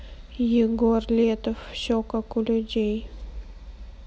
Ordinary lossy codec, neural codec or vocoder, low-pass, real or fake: none; none; none; real